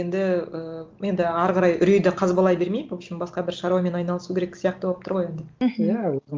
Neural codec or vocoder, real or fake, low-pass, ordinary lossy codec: none; real; 7.2 kHz; Opus, 32 kbps